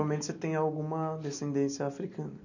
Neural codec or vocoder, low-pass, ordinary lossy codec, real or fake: none; 7.2 kHz; none; real